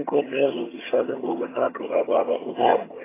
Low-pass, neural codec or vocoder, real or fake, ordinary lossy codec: 3.6 kHz; vocoder, 22.05 kHz, 80 mel bands, HiFi-GAN; fake; AAC, 16 kbps